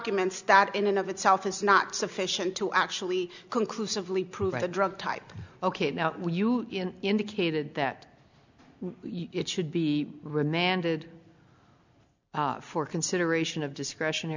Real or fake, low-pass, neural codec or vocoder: real; 7.2 kHz; none